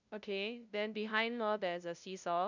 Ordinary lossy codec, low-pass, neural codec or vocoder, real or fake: none; 7.2 kHz; codec, 16 kHz, 0.5 kbps, FunCodec, trained on LibriTTS, 25 frames a second; fake